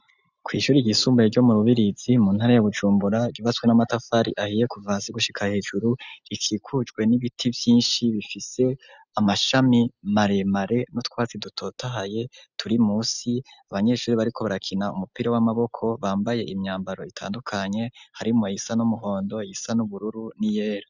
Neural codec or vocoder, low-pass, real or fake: none; 7.2 kHz; real